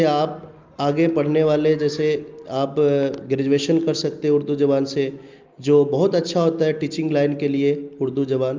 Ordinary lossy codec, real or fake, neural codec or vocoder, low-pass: Opus, 32 kbps; real; none; 7.2 kHz